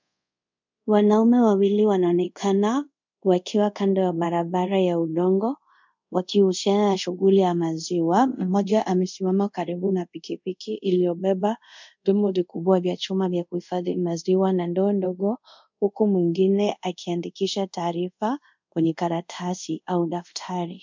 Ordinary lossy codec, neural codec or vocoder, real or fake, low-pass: MP3, 64 kbps; codec, 24 kHz, 0.5 kbps, DualCodec; fake; 7.2 kHz